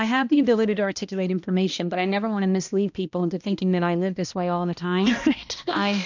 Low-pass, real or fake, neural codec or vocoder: 7.2 kHz; fake; codec, 16 kHz, 1 kbps, X-Codec, HuBERT features, trained on balanced general audio